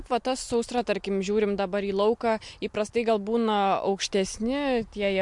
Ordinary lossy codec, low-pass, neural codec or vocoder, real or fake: MP3, 64 kbps; 10.8 kHz; none; real